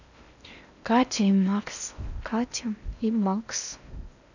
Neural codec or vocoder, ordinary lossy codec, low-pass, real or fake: codec, 16 kHz in and 24 kHz out, 0.8 kbps, FocalCodec, streaming, 65536 codes; none; 7.2 kHz; fake